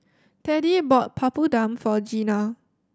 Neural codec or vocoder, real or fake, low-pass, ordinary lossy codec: none; real; none; none